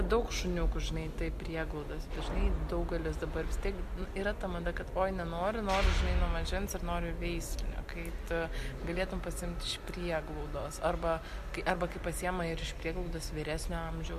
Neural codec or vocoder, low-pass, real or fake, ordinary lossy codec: none; 14.4 kHz; real; MP3, 64 kbps